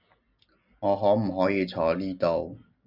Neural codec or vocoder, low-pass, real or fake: none; 5.4 kHz; real